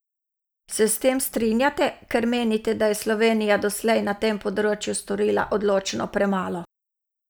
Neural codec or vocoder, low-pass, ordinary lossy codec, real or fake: none; none; none; real